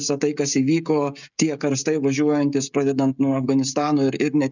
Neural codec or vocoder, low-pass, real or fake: codec, 16 kHz, 16 kbps, FreqCodec, smaller model; 7.2 kHz; fake